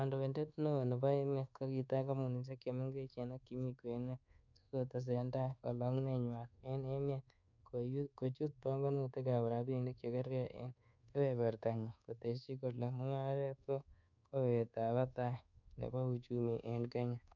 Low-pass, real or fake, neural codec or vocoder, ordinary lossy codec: 7.2 kHz; fake; codec, 24 kHz, 1.2 kbps, DualCodec; none